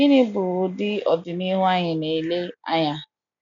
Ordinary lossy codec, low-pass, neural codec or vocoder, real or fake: none; 7.2 kHz; none; real